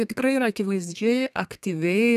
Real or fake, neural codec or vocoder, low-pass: fake; codec, 32 kHz, 1.9 kbps, SNAC; 14.4 kHz